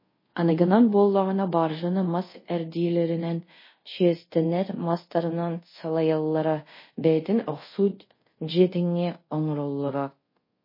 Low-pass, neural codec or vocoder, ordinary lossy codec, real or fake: 5.4 kHz; codec, 24 kHz, 0.5 kbps, DualCodec; MP3, 24 kbps; fake